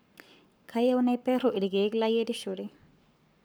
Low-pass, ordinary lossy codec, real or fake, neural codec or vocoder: none; none; fake; codec, 44.1 kHz, 7.8 kbps, Pupu-Codec